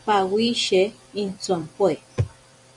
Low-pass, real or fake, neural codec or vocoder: 10.8 kHz; fake; vocoder, 44.1 kHz, 128 mel bands every 256 samples, BigVGAN v2